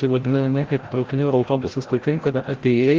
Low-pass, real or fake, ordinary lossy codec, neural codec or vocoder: 7.2 kHz; fake; Opus, 16 kbps; codec, 16 kHz, 0.5 kbps, FreqCodec, larger model